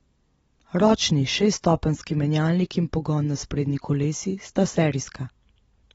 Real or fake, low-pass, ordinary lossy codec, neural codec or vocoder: fake; 19.8 kHz; AAC, 24 kbps; vocoder, 44.1 kHz, 128 mel bands every 256 samples, BigVGAN v2